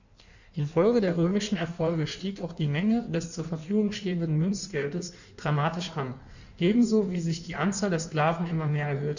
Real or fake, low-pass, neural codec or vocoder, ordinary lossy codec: fake; 7.2 kHz; codec, 16 kHz in and 24 kHz out, 1.1 kbps, FireRedTTS-2 codec; none